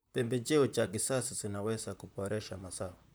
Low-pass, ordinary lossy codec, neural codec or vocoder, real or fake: none; none; vocoder, 44.1 kHz, 128 mel bands, Pupu-Vocoder; fake